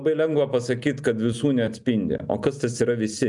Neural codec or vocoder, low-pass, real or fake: none; 10.8 kHz; real